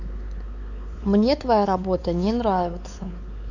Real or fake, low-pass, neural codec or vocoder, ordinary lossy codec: fake; 7.2 kHz; codec, 16 kHz, 4 kbps, X-Codec, WavLM features, trained on Multilingual LibriSpeech; none